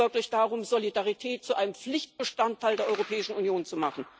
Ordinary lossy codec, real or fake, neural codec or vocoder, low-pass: none; real; none; none